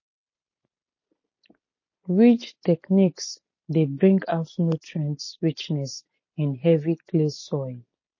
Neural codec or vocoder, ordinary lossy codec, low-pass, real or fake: none; MP3, 32 kbps; 7.2 kHz; real